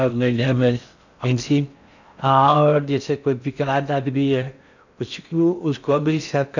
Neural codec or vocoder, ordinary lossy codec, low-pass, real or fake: codec, 16 kHz in and 24 kHz out, 0.6 kbps, FocalCodec, streaming, 4096 codes; none; 7.2 kHz; fake